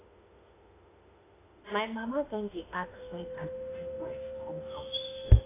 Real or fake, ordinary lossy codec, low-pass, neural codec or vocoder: fake; AAC, 16 kbps; 3.6 kHz; autoencoder, 48 kHz, 32 numbers a frame, DAC-VAE, trained on Japanese speech